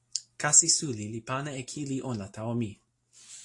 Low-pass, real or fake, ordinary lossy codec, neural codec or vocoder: 10.8 kHz; real; AAC, 48 kbps; none